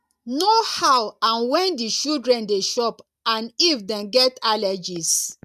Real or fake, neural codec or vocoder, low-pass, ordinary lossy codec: real; none; 14.4 kHz; none